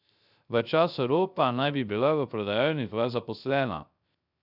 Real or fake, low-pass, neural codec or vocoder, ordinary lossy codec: fake; 5.4 kHz; codec, 16 kHz, 0.3 kbps, FocalCodec; none